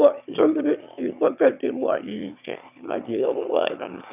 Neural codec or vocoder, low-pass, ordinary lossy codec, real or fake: autoencoder, 22.05 kHz, a latent of 192 numbers a frame, VITS, trained on one speaker; 3.6 kHz; none; fake